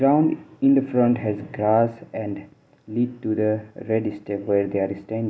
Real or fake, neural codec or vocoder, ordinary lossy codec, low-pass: real; none; none; none